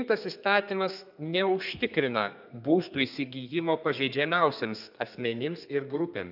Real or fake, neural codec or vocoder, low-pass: fake; codec, 32 kHz, 1.9 kbps, SNAC; 5.4 kHz